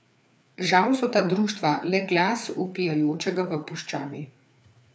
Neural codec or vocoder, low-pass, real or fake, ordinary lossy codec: codec, 16 kHz, 4 kbps, FreqCodec, larger model; none; fake; none